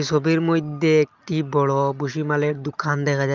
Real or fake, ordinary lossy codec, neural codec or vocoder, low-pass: real; Opus, 24 kbps; none; 7.2 kHz